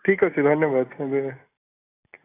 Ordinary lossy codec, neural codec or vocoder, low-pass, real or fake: AAC, 16 kbps; none; 3.6 kHz; real